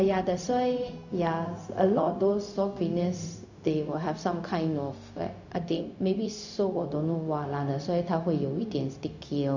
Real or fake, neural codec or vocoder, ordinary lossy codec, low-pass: fake; codec, 16 kHz, 0.4 kbps, LongCat-Audio-Codec; none; 7.2 kHz